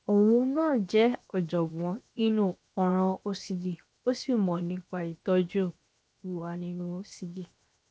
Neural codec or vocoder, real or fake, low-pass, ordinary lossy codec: codec, 16 kHz, 0.7 kbps, FocalCodec; fake; none; none